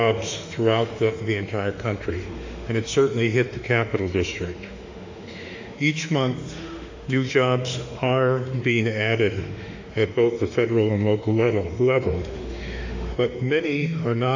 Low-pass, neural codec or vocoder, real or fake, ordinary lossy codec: 7.2 kHz; autoencoder, 48 kHz, 32 numbers a frame, DAC-VAE, trained on Japanese speech; fake; AAC, 48 kbps